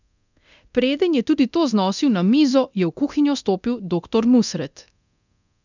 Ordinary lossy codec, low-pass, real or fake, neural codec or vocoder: none; 7.2 kHz; fake; codec, 24 kHz, 0.9 kbps, DualCodec